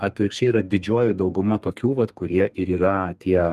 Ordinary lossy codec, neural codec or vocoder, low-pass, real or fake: Opus, 32 kbps; codec, 32 kHz, 1.9 kbps, SNAC; 14.4 kHz; fake